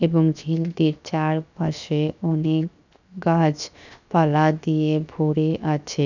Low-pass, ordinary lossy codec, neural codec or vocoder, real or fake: 7.2 kHz; none; codec, 16 kHz, 0.7 kbps, FocalCodec; fake